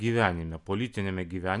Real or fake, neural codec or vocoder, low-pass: real; none; 10.8 kHz